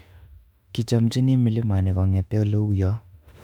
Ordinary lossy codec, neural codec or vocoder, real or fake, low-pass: none; autoencoder, 48 kHz, 32 numbers a frame, DAC-VAE, trained on Japanese speech; fake; 19.8 kHz